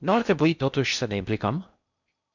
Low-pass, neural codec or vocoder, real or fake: 7.2 kHz; codec, 16 kHz in and 24 kHz out, 0.6 kbps, FocalCodec, streaming, 4096 codes; fake